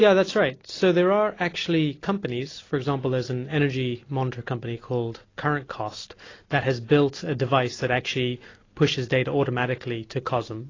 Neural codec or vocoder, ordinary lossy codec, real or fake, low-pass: none; AAC, 32 kbps; real; 7.2 kHz